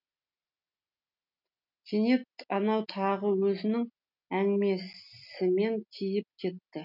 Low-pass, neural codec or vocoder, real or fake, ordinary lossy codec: 5.4 kHz; none; real; none